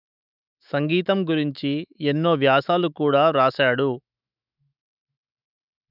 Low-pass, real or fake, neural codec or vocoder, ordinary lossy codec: 5.4 kHz; fake; autoencoder, 48 kHz, 128 numbers a frame, DAC-VAE, trained on Japanese speech; none